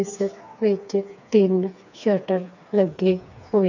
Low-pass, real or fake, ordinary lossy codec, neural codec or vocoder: 7.2 kHz; fake; none; codec, 16 kHz, 4 kbps, FreqCodec, smaller model